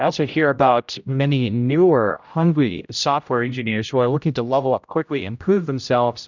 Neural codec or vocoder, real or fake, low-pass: codec, 16 kHz, 0.5 kbps, X-Codec, HuBERT features, trained on general audio; fake; 7.2 kHz